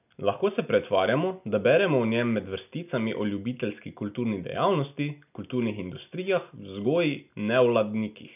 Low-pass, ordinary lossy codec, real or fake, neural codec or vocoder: 3.6 kHz; none; real; none